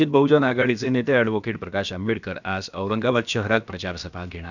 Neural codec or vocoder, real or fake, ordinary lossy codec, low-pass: codec, 16 kHz, about 1 kbps, DyCAST, with the encoder's durations; fake; none; 7.2 kHz